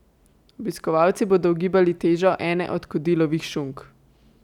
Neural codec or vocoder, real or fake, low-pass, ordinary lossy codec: none; real; 19.8 kHz; none